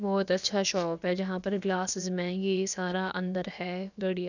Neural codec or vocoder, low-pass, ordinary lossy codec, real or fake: codec, 16 kHz, about 1 kbps, DyCAST, with the encoder's durations; 7.2 kHz; none; fake